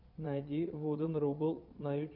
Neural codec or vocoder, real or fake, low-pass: vocoder, 22.05 kHz, 80 mel bands, Vocos; fake; 5.4 kHz